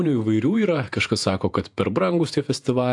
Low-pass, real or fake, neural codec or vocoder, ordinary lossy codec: 10.8 kHz; fake; vocoder, 48 kHz, 128 mel bands, Vocos; AAC, 64 kbps